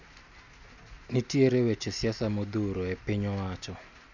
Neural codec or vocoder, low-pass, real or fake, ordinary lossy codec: none; 7.2 kHz; real; none